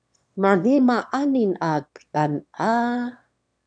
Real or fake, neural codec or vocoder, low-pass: fake; autoencoder, 22.05 kHz, a latent of 192 numbers a frame, VITS, trained on one speaker; 9.9 kHz